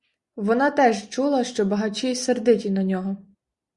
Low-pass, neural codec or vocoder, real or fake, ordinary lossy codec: 10.8 kHz; none; real; Opus, 64 kbps